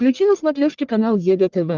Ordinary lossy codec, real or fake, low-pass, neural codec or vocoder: Opus, 24 kbps; fake; 7.2 kHz; codec, 44.1 kHz, 1.7 kbps, Pupu-Codec